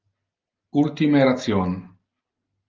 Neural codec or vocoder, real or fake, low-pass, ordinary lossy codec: none; real; 7.2 kHz; Opus, 32 kbps